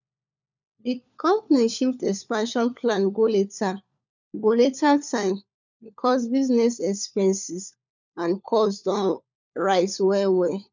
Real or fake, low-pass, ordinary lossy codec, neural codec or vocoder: fake; 7.2 kHz; none; codec, 16 kHz, 4 kbps, FunCodec, trained on LibriTTS, 50 frames a second